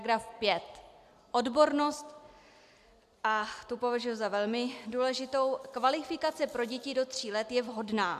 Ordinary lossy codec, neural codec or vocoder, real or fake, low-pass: AAC, 96 kbps; none; real; 14.4 kHz